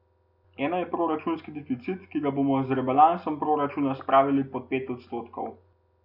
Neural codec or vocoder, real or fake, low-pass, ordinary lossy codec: none; real; 5.4 kHz; none